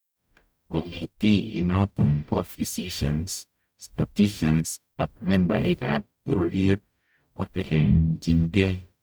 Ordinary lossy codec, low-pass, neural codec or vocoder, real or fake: none; none; codec, 44.1 kHz, 0.9 kbps, DAC; fake